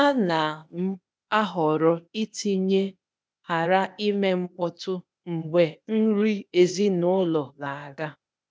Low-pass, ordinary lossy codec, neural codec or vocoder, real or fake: none; none; codec, 16 kHz, 0.8 kbps, ZipCodec; fake